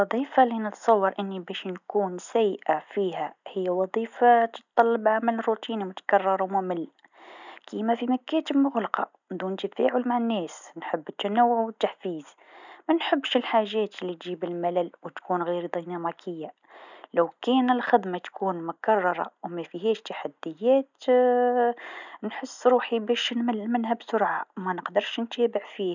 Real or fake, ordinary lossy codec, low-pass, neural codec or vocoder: real; none; 7.2 kHz; none